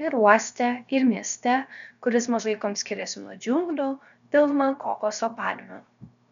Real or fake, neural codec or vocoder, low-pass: fake; codec, 16 kHz, 0.7 kbps, FocalCodec; 7.2 kHz